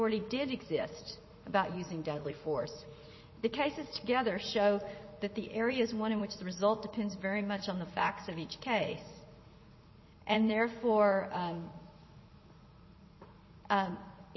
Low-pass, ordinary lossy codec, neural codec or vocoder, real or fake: 7.2 kHz; MP3, 24 kbps; vocoder, 44.1 kHz, 80 mel bands, Vocos; fake